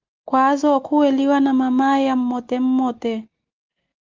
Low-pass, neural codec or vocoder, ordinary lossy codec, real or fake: 7.2 kHz; none; Opus, 24 kbps; real